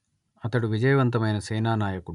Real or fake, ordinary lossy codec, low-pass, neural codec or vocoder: real; none; 10.8 kHz; none